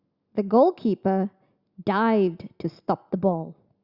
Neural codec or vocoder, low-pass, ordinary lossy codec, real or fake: none; 5.4 kHz; Opus, 64 kbps; real